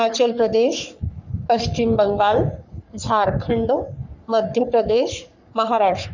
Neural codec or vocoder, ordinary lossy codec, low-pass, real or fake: codec, 44.1 kHz, 3.4 kbps, Pupu-Codec; none; 7.2 kHz; fake